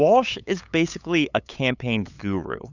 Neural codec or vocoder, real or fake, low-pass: codec, 16 kHz, 8 kbps, FunCodec, trained on LibriTTS, 25 frames a second; fake; 7.2 kHz